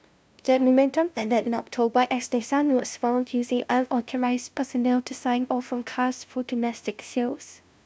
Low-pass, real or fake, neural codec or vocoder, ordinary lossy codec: none; fake; codec, 16 kHz, 0.5 kbps, FunCodec, trained on LibriTTS, 25 frames a second; none